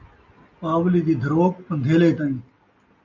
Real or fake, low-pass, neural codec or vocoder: real; 7.2 kHz; none